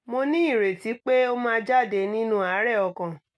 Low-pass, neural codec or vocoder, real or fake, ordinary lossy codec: none; none; real; none